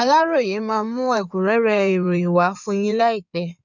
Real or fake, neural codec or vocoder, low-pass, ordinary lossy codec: fake; codec, 16 kHz in and 24 kHz out, 2.2 kbps, FireRedTTS-2 codec; 7.2 kHz; none